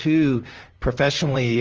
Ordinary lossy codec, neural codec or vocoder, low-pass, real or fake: Opus, 24 kbps; vocoder, 44.1 kHz, 128 mel bands, Pupu-Vocoder; 7.2 kHz; fake